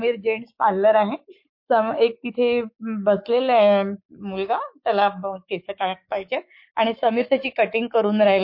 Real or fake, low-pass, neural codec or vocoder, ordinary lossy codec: fake; 5.4 kHz; codec, 44.1 kHz, 7.8 kbps, Pupu-Codec; MP3, 32 kbps